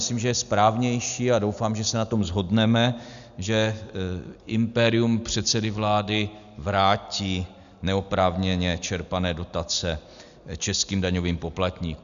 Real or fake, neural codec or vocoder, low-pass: real; none; 7.2 kHz